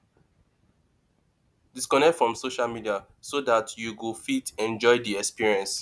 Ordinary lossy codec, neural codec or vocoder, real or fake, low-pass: none; none; real; none